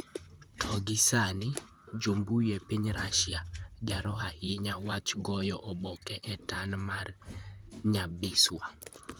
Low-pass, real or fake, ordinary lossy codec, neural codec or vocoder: none; fake; none; vocoder, 44.1 kHz, 128 mel bands, Pupu-Vocoder